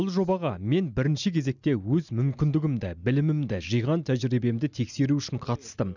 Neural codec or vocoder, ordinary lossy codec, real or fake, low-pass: none; none; real; 7.2 kHz